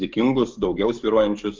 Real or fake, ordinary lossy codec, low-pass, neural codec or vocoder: fake; Opus, 32 kbps; 7.2 kHz; codec, 44.1 kHz, 7.8 kbps, DAC